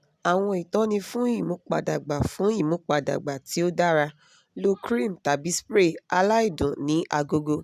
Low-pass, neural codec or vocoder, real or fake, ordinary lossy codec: 14.4 kHz; none; real; none